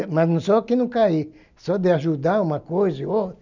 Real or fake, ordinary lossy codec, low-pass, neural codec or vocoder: real; none; 7.2 kHz; none